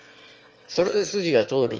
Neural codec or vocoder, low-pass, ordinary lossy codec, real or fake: autoencoder, 22.05 kHz, a latent of 192 numbers a frame, VITS, trained on one speaker; 7.2 kHz; Opus, 24 kbps; fake